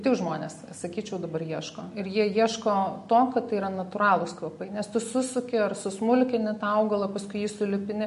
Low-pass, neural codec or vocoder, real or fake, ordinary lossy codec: 14.4 kHz; none; real; MP3, 48 kbps